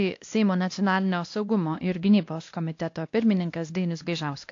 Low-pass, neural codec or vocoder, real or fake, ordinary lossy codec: 7.2 kHz; codec, 16 kHz, 1 kbps, X-Codec, WavLM features, trained on Multilingual LibriSpeech; fake; AAC, 48 kbps